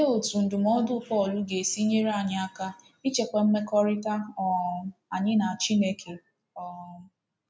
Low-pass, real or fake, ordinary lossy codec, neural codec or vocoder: none; real; none; none